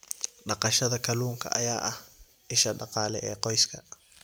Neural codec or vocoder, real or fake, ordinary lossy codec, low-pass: none; real; none; none